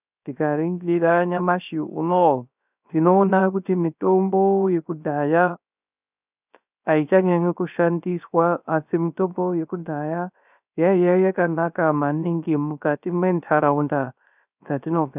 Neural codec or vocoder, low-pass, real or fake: codec, 16 kHz, 0.3 kbps, FocalCodec; 3.6 kHz; fake